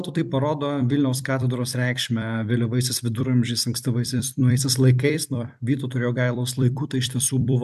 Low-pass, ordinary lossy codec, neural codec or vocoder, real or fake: 14.4 kHz; AAC, 96 kbps; vocoder, 44.1 kHz, 128 mel bands every 256 samples, BigVGAN v2; fake